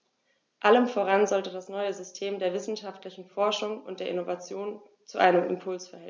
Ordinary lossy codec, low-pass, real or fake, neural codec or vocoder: none; none; real; none